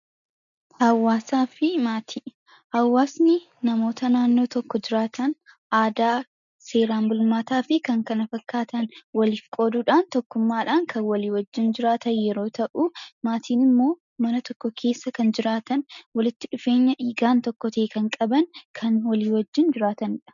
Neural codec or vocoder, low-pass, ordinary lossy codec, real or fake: none; 7.2 kHz; MP3, 96 kbps; real